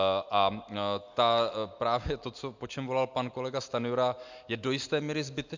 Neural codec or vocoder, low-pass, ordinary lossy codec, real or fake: none; 7.2 kHz; MP3, 64 kbps; real